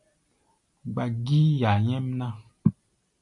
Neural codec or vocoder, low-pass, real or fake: none; 10.8 kHz; real